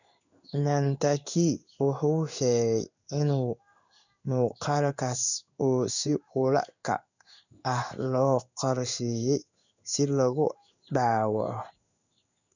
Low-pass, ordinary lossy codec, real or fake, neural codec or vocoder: 7.2 kHz; none; fake; codec, 16 kHz in and 24 kHz out, 1 kbps, XY-Tokenizer